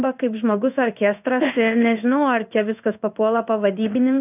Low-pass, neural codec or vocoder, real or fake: 3.6 kHz; codec, 16 kHz in and 24 kHz out, 1 kbps, XY-Tokenizer; fake